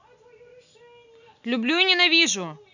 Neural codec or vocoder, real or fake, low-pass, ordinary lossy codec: none; real; 7.2 kHz; none